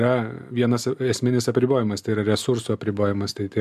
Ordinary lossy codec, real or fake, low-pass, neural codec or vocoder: MP3, 96 kbps; real; 14.4 kHz; none